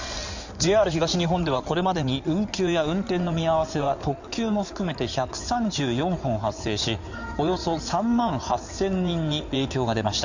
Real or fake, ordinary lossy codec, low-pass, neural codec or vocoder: fake; none; 7.2 kHz; codec, 16 kHz in and 24 kHz out, 2.2 kbps, FireRedTTS-2 codec